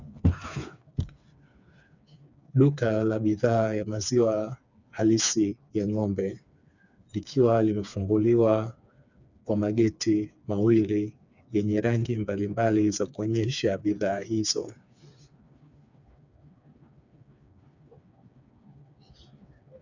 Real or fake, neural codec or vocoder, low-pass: fake; codec, 16 kHz, 4 kbps, FreqCodec, smaller model; 7.2 kHz